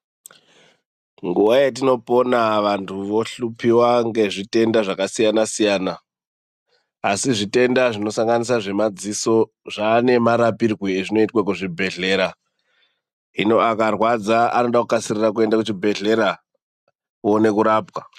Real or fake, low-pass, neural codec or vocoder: real; 14.4 kHz; none